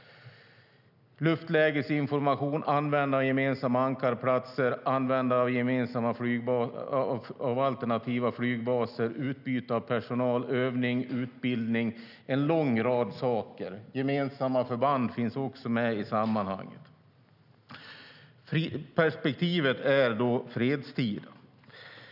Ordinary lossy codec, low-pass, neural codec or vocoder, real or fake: none; 5.4 kHz; none; real